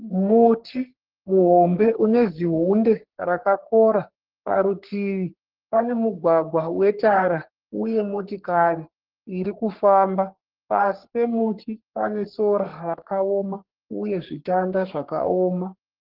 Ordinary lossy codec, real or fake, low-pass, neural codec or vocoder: Opus, 16 kbps; fake; 5.4 kHz; codec, 44.1 kHz, 3.4 kbps, Pupu-Codec